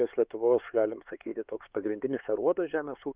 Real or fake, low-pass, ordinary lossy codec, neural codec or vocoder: fake; 3.6 kHz; Opus, 24 kbps; codec, 16 kHz, 4 kbps, X-Codec, HuBERT features, trained on LibriSpeech